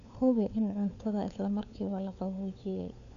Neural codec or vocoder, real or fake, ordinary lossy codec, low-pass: codec, 16 kHz, 4 kbps, FunCodec, trained on LibriTTS, 50 frames a second; fake; none; 7.2 kHz